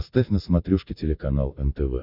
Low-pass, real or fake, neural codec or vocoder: 5.4 kHz; real; none